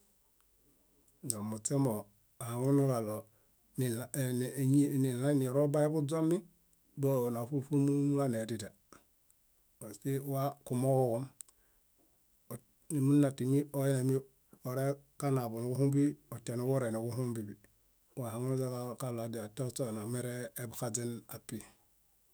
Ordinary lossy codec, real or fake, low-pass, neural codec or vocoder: none; fake; none; autoencoder, 48 kHz, 128 numbers a frame, DAC-VAE, trained on Japanese speech